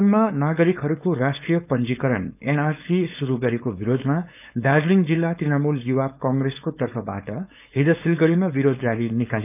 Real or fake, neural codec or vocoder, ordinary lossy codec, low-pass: fake; codec, 16 kHz, 4.8 kbps, FACodec; none; 3.6 kHz